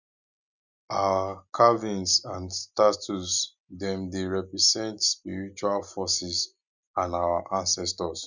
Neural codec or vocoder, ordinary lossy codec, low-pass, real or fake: none; none; 7.2 kHz; real